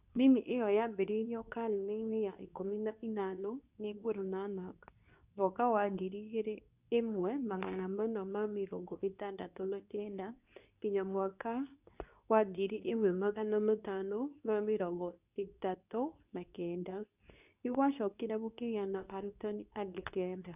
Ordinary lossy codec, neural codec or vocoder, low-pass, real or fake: none; codec, 24 kHz, 0.9 kbps, WavTokenizer, medium speech release version 2; 3.6 kHz; fake